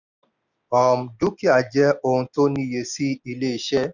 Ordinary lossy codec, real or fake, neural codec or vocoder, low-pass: none; real; none; 7.2 kHz